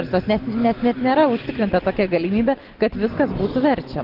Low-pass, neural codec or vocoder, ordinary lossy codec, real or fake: 5.4 kHz; none; Opus, 16 kbps; real